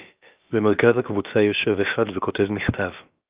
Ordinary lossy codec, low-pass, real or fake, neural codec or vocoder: Opus, 32 kbps; 3.6 kHz; fake; codec, 16 kHz, about 1 kbps, DyCAST, with the encoder's durations